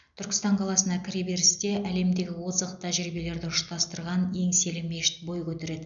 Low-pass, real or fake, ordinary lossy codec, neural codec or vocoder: 7.2 kHz; real; none; none